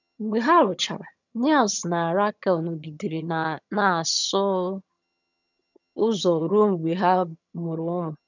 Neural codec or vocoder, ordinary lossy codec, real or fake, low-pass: vocoder, 22.05 kHz, 80 mel bands, HiFi-GAN; none; fake; 7.2 kHz